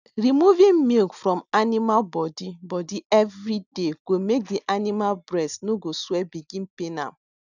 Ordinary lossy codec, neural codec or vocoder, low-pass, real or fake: none; none; 7.2 kHz; real